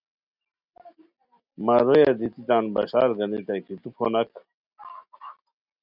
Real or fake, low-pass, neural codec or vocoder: real; 5.4 kHz; none